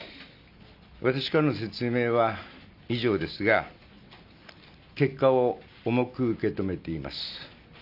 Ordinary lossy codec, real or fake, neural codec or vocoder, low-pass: none; real; none; 5.4 kHz